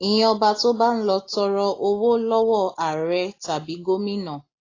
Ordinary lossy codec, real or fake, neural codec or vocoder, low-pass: AAC, 32 kbps; real; none; 7.2 kHz